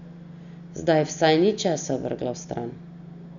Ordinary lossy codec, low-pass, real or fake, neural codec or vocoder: none; 7.2 kHz; real; none